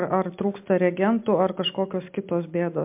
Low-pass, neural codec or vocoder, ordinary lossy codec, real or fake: 3.6 kHz; none; MP3, 32 kbps; real